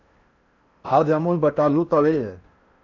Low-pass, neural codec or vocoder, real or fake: 7.2 kHz; codec, 16 kHz in and 24 kHz out, 0.6 kbps, FocalCodec, streaming, 4096 codes; fake